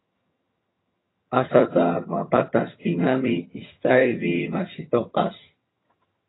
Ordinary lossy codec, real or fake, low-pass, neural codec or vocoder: AAC, 16 kbps; fake; 7.2 kHz; vocoder, 22.05 kHz, 80 mel bands, HiFi-GAN